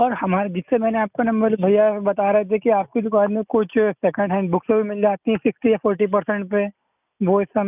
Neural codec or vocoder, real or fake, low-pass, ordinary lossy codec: none; real; 3.6 kHz; none